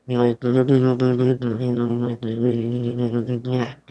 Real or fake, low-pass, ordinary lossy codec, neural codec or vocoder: fake; none; none; autoencoder, 22.05 kHz, a latent of 192 numbers a frame, VITS, trained on one speaker